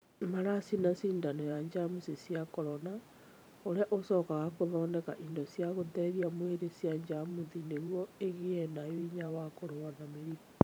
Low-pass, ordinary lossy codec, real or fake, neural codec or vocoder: none; none; fake; vocoder, 44.1 kHz, 128 mel bands every 512 samples, BigVGAN v2